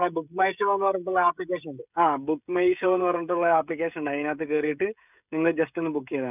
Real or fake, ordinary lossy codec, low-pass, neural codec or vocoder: fake; none; 3.6 kHz; codec, 16 kHz, 16 kbps, FreqCodec, smaller model